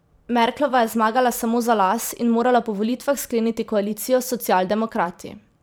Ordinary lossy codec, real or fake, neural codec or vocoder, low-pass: none; fake; vocoder, 44.1 kHz, 128 mel bands every 512 samples, BigVGAN v2; none